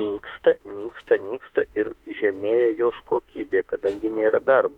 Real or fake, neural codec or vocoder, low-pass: fake; autoencoder, 48 kHz, 32 numbers a frame, DAC-VAE, trained on Japanese speech; 19.8 kHz